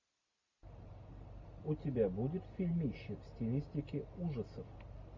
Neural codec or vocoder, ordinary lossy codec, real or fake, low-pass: none; MP3, 64 kbps; real; 7.2 kHz